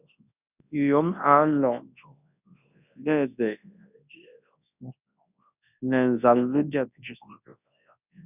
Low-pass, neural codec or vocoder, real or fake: 3.6 kHz; codec, 24 kHz, 0.9 kbps, WavTokenizer, large speech release; fake